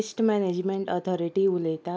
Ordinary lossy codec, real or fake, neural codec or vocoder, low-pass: none; real; none; none